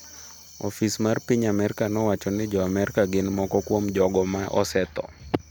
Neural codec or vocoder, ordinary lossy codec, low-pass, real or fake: none; none; none; real